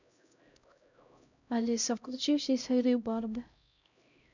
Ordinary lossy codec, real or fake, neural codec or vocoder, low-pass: none; fake; codec, 16 kHz, 0.5 kbps, X-Codec, HuBERT features, trained on LibriSpeech; 7.2 kHz